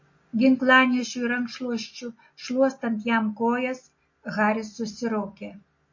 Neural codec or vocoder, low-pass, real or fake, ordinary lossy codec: none; 7.2 kHz; real; MP3, 32 kbps